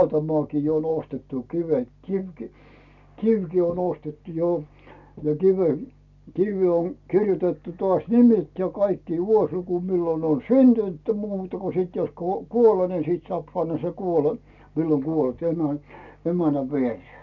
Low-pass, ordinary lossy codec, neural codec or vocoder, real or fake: 7.2 kHz; none; none; real